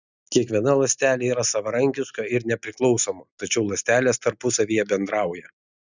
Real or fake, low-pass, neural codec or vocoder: real; 7.2 kHz; none